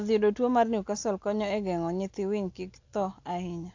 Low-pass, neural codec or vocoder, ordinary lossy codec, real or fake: 7.2 kHz; none; AAC, 48 kbps; real